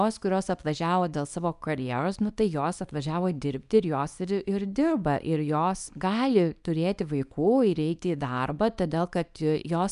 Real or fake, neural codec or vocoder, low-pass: fake; codec, 24 kHz, 0.9 kbps, WavTokenizer, small release; 10.8 kHz